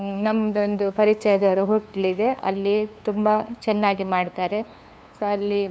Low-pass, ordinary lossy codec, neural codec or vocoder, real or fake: none; none; codec, 16 kHz, 2 kbps, FunCodec, trained on LibriTTS, 25 frames a second; fake